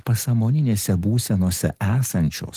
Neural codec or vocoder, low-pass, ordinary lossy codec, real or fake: none; 14.4 kHz; Opus, 16 kbps; real